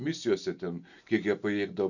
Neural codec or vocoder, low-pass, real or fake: none; 7.2 kHz; real